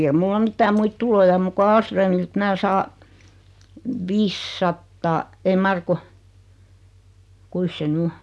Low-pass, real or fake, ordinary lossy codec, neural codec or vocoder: none; real; none; none